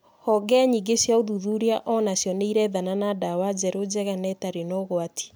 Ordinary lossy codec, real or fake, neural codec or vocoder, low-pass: none; real; none; none